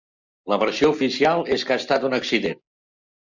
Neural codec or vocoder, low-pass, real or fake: none; 7.2 kHz; real